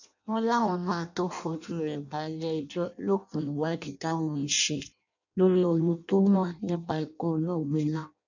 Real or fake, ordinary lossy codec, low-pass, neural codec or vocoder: fake; none; 7.2 kHz; codec, 16 kHz in and 24 kHz out, 0.6 kbps, FireRedTTS-2 codec